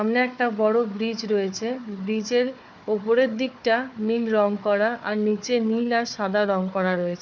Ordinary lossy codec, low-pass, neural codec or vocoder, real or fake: none; 7.2 kHz; codec, 16 kHz, 4 kbps, FunCodec, trained on Chinese and English, 50 frames a second; fake